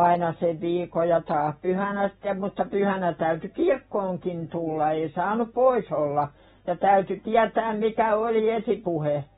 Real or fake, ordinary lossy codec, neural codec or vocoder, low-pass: real; AAC, 16 kbps; none; 19.8 kHz